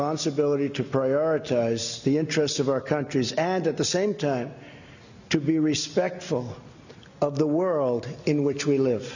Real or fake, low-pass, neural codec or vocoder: real; 7.2 kHz; none